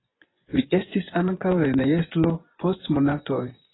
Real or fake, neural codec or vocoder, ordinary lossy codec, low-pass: fake; vocoder, 24 kHz, 100 mel bands, Vocos; AAC, 16 kbps; 7.2 kHz